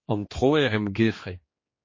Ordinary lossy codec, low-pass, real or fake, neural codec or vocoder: MP3, 32 kbps; 7.2 kHz; fake; codec, 16 kHz, 2 kbps, X-Codec, HuBERT features, trained on general audio